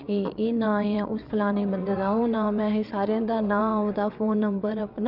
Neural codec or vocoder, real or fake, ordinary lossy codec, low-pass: vocoder, 22.05 kHz, 80 mel bands, WaveNeXt; fake; none; 5.4 kHz